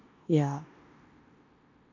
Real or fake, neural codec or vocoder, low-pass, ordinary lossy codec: fake; codec, 16 kHz in and 24 kHz out, 0.9 kbps, LongCat-Audio-Codec, four codebook decoder; 7.2 kHz; MP3, 64 kbps